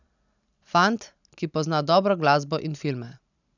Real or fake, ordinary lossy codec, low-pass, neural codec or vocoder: real; none; 7.2 kHz; none